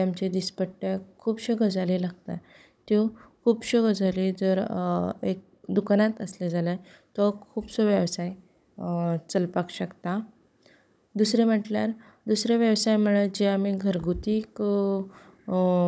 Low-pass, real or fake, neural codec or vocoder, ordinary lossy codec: none; fake; codec, 16 kHz, 16 kbps, FunCodec, trained on Chinese and English, 50 frames a second; none